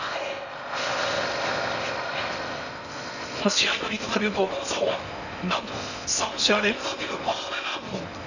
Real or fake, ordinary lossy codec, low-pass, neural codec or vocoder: fake; none; 7.2 kHz; codec, 16 kHz in and 24 kHz out, 0.6 kbps, FocalCodec, streaming, 4096 codes